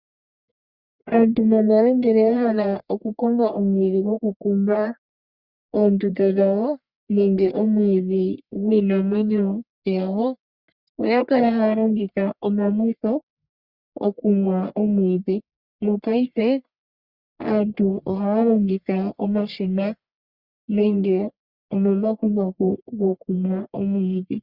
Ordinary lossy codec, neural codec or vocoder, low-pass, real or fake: Opus, 64 kbps; codec, 44.1 kHz, 1.7 kbps, Pupu-Codec; 5.4 kHz; fake